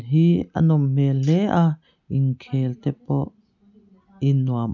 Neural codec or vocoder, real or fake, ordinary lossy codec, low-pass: none; real; none; 7.2 kHz